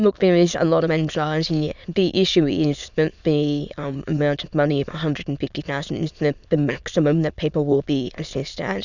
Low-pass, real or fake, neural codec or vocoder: 7.2 kHz; fake; autoencoder, 22.05 kHz, a latent of 192 numbers a frame, VITS, trained on many speakers